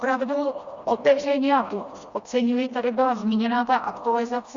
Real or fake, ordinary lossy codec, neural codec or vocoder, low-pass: fake; Opus, 64 kbps; codec, 16 kHz, 1 kbps, FreqCodec, smaller model; 7.2 kHz